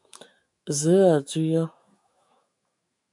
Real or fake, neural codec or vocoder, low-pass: fake; autoencoder, 48 kHz, 128 numbers a frame, DAC-VAE, trained on Japanese speech; 10.8 kHz